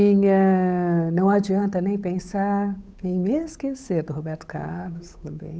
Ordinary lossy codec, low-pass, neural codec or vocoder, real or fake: none; none; codec, 16 kHz, 8 kbps, FunCodec, trained on Chinese and English, 25 frames a second; fake